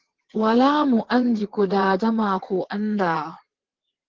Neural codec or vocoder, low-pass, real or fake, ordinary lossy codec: vocoder, 22.05 kHz, 80 mel bands, WaveNeXt; 7.2 kHz; fake; Opus, 16 kbps